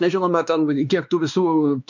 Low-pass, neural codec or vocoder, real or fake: 7.2 kHz; codec, 16 kHz, 2 kbps, X-Codec, WavLM features, trained on Multilingual LibriSpeech; fake